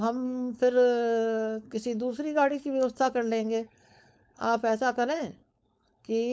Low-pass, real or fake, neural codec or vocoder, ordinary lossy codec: none; fake; codec, 16 kHz, 4.8 kbps, FACodec; none